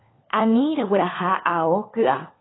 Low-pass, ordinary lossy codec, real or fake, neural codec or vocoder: 7.2 kHz; AAC, 16 kbps; fake; codec, 16 kHz, 4 kbps, FunCodec, trained on LibriTTS, 50 frames a second